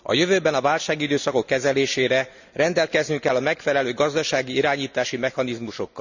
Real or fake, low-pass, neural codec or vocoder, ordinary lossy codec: real; 7.2 kHz; none; none